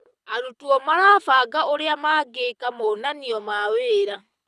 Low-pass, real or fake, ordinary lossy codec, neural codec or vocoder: none; fake; none; codec, 24 kHz, 6 kbps, HILCodec